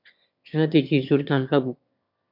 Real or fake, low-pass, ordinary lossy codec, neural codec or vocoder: fake; 5.4 kHz; MP3, 48 kbps; autoencoder, 22.05 kHz, a latent of 192 numbers a frame, VITS, trained on one speaker